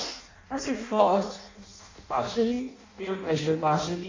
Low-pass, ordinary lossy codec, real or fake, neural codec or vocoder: 7.2 kHz; MP3, 48 kbps; fake; codec, 16 kHz in and 24 kHz out, 0.6 kbps, FireRedTTS-2 codec